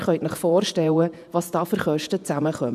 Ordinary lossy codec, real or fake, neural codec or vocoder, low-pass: none; fake; vocoder, 48 kHz, 128 mel bands, Vocos; 14.4 kHz